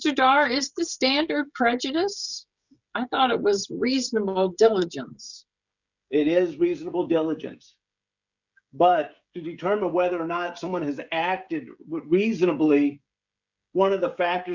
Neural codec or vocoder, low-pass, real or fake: codec, 16 kHz, 16 kbps, FreqCodec, smaller model; 7.2 kHz; fake